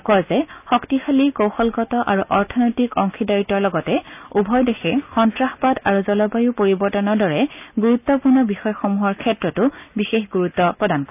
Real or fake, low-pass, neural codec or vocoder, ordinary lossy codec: real; 3.6 kHz; none; none